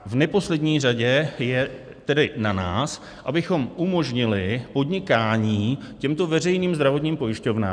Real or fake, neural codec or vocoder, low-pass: real; none; 9.9 kHz